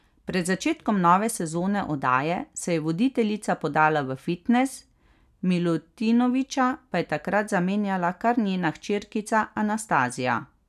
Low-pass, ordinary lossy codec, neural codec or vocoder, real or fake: 14.4 kHz; none; none; real